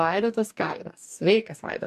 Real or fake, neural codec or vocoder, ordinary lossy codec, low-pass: fake; codec, 44.1 kHz, 2.6 kbps, DAC; AAC, 96 kbps; 14.4 kHz